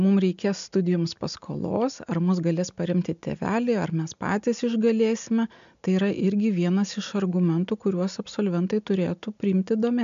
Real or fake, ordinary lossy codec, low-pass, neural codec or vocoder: real; MP3, 64 kbps; 7.2 kHz; none